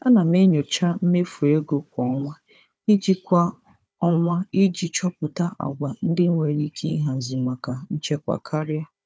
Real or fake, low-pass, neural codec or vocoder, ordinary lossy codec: fake; none; codec, 16 kHz, 4 kbps, FunCodec, trained on Chinese and English, 50 frames a second; none